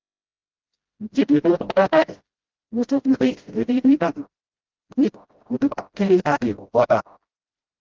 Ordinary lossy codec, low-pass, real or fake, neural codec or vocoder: Opus, 16 kbps; 7.2 kHz; fake; codec, 16 kHz, 0.5 kbps, FreqCodec, smaller model